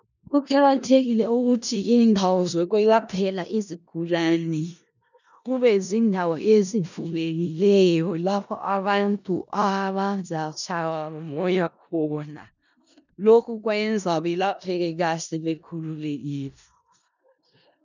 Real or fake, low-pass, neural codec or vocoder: fake; 7.2 kHz; codec, 16 kHz in and 24 kHz out, 0.4 kbps, LongCat-Audio-Codec, four codebook decoder